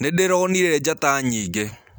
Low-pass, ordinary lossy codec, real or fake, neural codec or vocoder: none; none; real; none